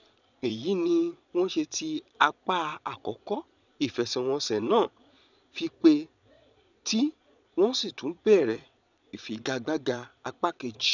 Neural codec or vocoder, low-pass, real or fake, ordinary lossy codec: vocoder, 22.05 kHz, 80 mel bands, Vocos; 7.2 kHz; fake; none